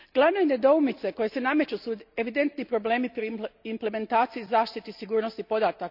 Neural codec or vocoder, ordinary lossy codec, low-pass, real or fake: none; none; 5.4 kHz; real